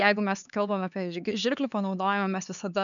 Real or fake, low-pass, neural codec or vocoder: fake; 7.2 kHz; codec, 16 kHz, 4 kbps, X-Codec, HuBERT features, trained on LibriSpeech